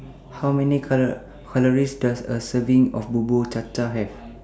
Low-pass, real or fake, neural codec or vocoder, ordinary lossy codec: none; real; none; none